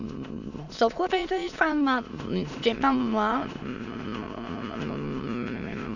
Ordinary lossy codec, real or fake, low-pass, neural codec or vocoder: none; fake; 7.2 kHz; autoencoder, 22.05 kHz, a latent of 192 numbers a frame, VITS, trained on many speakers